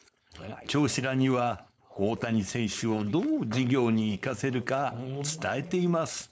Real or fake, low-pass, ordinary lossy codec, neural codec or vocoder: fake; none; none; codec, 16 kHz, 4.8 kbps, FACodec